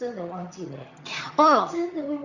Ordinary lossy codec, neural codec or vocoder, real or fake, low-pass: Opus, 64 kbps; vocoder, 22.05 kHz, 80 mel bands, HiFi-GAN; fake; 7.2 kHz